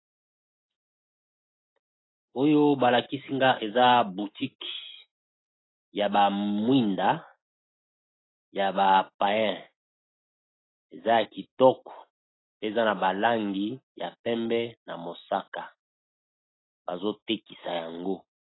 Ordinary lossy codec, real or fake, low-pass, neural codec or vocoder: AAC, 16 kbps; real; 7.2 kHz; none